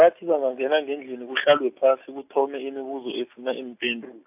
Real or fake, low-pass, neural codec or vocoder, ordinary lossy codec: real; 3.6 kHz; none; AAC, 32 kbps